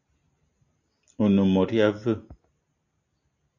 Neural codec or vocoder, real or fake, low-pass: none; real; 7.2 kHz